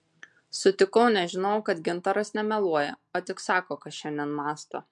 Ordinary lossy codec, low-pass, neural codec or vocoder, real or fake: MP3, 64 kbps; 10.8 kHz; none; real